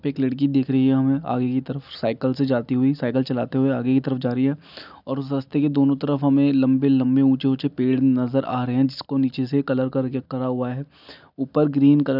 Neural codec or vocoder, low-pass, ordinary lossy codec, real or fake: none; 5.4 kHz; none; real